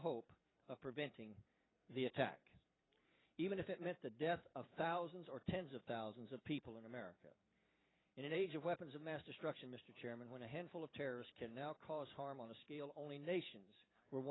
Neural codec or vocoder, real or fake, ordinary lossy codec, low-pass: vocoder, 44.1 kHz, 128 mel bands every 512 samples, BigVGAN v2; fake; AAC, 16 kbps; 7.2 kHz